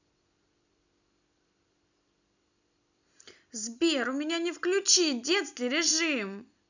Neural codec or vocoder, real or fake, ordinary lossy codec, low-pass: none; real; none; 7.2 kHz